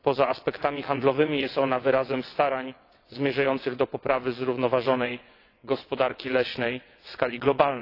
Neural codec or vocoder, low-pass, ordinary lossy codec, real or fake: vocoder, 22.05 kHz, 80 mel bands, WaveNeXt; 5.4 kHz; AAC, 32 kbps; fake